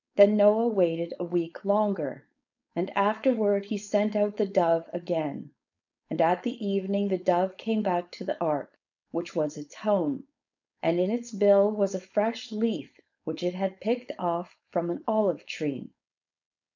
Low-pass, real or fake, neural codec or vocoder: 7.2 kHz; fake; codec, 16 kHz, 4.8 kbps, FACodec